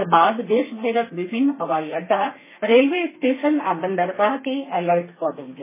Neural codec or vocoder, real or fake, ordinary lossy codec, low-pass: codec, 32 kHz, 1.9 kbps, SNAC; fake; MP3, 16 kbps; 3.6 kHz